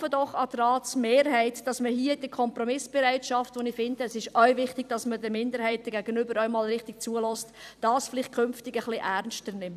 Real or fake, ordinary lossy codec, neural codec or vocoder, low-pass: fake; none; vocoder, 44.1 kHz, 128 mel bands every 256 samples, BigVGAN v2; 14.4 kHz